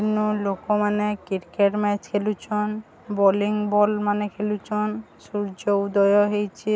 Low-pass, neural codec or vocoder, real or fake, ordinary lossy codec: none; none; real; none